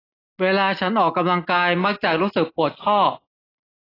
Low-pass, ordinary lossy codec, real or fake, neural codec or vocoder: 5.4 kHz; AAC, 24 kbps; real; none